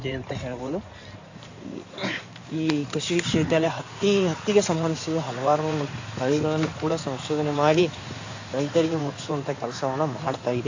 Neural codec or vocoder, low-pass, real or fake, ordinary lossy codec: codec, 16 kHz in and 24 kHz out, 2.2 kbps, FireRedTTS-2 codec; 7.2 kHz; fake; AAC, 48 kbps